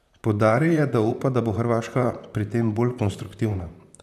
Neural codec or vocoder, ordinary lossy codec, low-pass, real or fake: vocoder, 44.1 kHz, 128 mel bands, Pupu-Vocoder; none; 14.4 kHz; fake